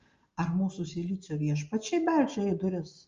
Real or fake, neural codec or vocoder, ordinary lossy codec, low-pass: real; none; Opus, 32 kbps; 7.2 kHz